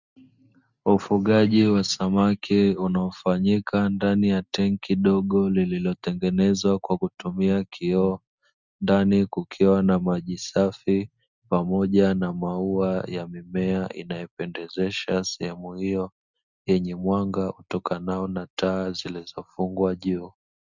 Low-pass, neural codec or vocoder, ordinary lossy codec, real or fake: 7.2 kHz; none; Opus, 64 kbps; real